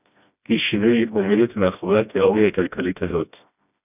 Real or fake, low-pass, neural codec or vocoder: fake; 3.6 kHz; codec, 16 kHz, 1 kbps, FreqCodec, smaller model